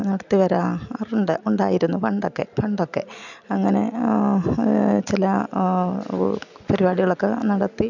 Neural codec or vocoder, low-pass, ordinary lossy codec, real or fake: none; 7.2 kHz; none; real